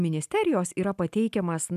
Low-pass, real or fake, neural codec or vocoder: 14.4 kHz; real; none